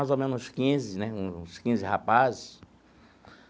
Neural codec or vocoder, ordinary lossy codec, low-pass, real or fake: none; none; none; real